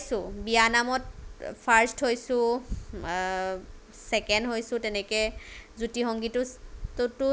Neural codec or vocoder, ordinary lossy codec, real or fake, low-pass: none; none; real; none